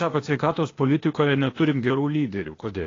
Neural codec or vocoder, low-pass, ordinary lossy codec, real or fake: codec, 16 kHz, 0.8 kbps, ZipCodec; 7.2 kHz; AAC, 32 kbps; fake